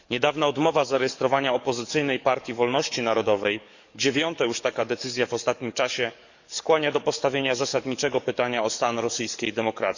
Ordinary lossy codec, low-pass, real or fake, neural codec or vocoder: none; 7.2 kHz; fake; codec, 44.1 kHz, 7.8 kbps, DAC